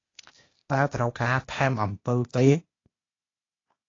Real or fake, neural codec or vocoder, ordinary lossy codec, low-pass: fake; codec, 16 kHz, 0.8 kbps, ZipCodec; AAC, 32 kbps; 7.2 kHz